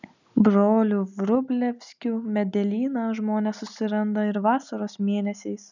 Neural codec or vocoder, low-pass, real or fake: none; 7.2 kHz; real